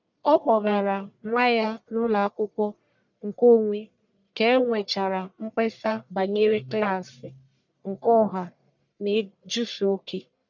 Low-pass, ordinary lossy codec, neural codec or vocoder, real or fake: 7.2 kHz; none; codec, 44.1 kHz, 1.7 kbps, Pupu-Codec; fake